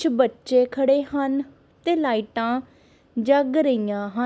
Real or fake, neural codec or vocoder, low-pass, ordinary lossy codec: real; none; none; none